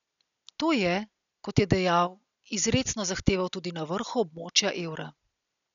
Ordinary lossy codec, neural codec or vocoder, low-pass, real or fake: none; none; 7.2 kHz; real